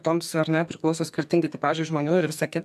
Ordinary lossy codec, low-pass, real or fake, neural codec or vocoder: AAC, 96 kbps; 14.4 kHz; fake; codec, 44.1 kHz, 2.6 kbps, SNAC